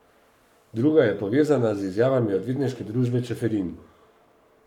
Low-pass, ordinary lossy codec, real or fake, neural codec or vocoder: 19.8 kHz; none; fake; codec, 44.1 kHz, 7.8 kbps, Pupu-Codec